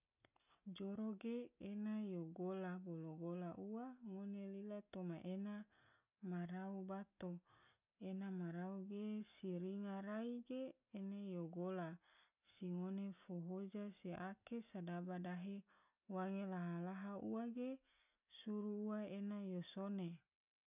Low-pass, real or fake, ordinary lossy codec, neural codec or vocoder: 3.6 kHz; real; none; none